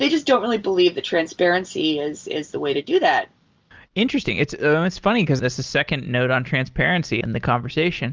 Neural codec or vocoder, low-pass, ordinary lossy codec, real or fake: none; 7.2 kHz; Opus, 32 kbps; real